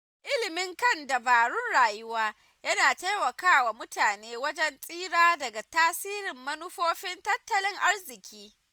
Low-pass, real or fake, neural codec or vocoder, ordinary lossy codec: none; real; none; none